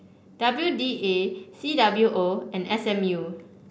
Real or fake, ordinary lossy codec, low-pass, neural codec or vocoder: real; none; none; none